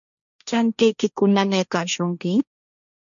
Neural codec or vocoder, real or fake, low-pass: codec, 16 kHz, 1.1 kbps, Voila-Tokenizer; fake; 7.2 kHz